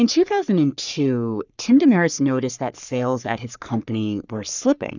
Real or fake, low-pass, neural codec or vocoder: fake; 7.2 kHz; codec, 44.1 kHz, 3.4 kbps, Pupu-Codec